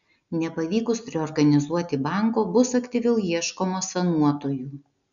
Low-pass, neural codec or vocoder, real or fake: 7.2 kHz; none; real